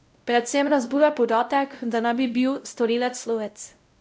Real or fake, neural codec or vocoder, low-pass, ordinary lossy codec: fake; codec, 16 kHz, 0.5 kbps, X-Codec, WavLM features, trained on Multilingual LibriSpeech; none; none